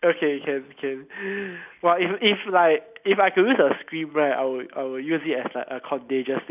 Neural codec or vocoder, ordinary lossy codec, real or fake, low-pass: none; none; real; 3.6 kHz